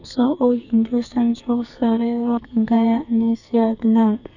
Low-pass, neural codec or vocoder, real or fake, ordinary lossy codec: 7.2 kHz; codec, 44.1 kHz, 2.6 kbps, SNAC; fake; none